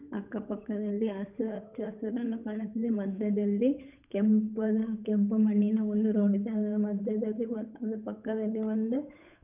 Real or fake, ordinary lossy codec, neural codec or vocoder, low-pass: fake; none; codec, 16 kHz, 8 kbps, FunCodec, trained on Chinese and English, 25 frames a second; 3.6 kHz